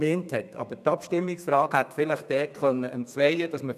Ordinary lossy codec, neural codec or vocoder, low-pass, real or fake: none; codec, 32 kHz, 1.9 kbps, SNAC; 14.4 kHz; fake